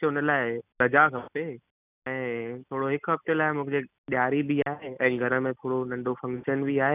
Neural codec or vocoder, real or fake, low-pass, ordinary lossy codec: none; real; 3.6 kHz; none